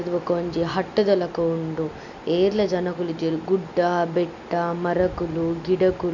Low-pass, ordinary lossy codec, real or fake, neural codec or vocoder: 7.2 kHz; none; real; none